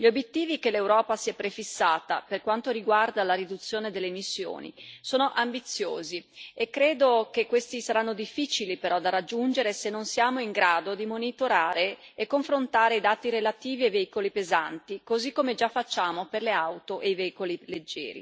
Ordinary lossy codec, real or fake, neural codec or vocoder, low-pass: none; real; none; none